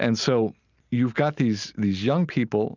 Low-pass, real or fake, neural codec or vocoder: 7.2 kHz; real; none